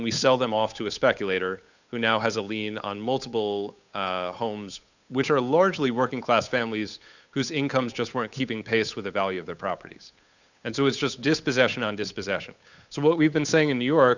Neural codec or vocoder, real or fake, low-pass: codec, 16 kHz, 8 kbps, FunCodec, trained on Chinese and English, 25 frames a second; fake; 7.2 kHz